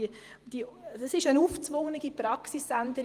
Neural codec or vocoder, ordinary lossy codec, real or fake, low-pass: vocoder, 48 kHz, 128 mel bands, Vocos; Opus, 24 kbps; fake; 14.4 kHz